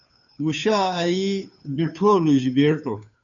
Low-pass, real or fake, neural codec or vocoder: 7.2 kHz; fake; codec, 16 kHz, 2 kbps, FunCodec, trained on Chinese and English, 25 frames a second